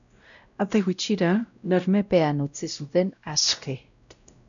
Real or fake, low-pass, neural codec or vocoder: fake; 7.2 kHz; codec, 16 kHz, 0.5 kbps, X-Codec, WavLM features, trained on Multilingual LibriSpeech